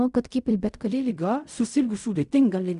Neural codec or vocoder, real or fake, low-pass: codec, 16 kHz in and 24 kHz out, 0.4 kbps, LongCat-Audio-Codec, fine tuned four codebook decoder; fake; 10.8 kHz